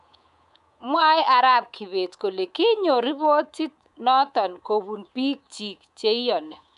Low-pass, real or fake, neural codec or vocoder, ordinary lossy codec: 10.8 kHz; fake; codec, 24 kHz, 3.1 kbps, DualCodec; none